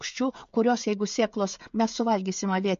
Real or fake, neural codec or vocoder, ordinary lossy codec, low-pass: fake; codec, 16 kHz, 16 kbps, FreqCodec, smaller model; MP3, 48 kbps; 7.2 kHz